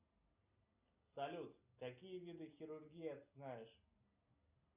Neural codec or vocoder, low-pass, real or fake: none; 3.6 kHz; real